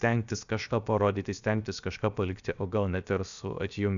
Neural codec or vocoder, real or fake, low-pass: codec, 16 kHz, 0.7 kbps, FocalCodec; fake; 7.2 kHz